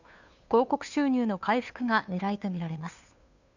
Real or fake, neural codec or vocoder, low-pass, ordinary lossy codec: fake; codec, 16 kHz, 2 kbps, FunCodec, trained on Chinese and English, 25 frames a second; 7.2 kHz; none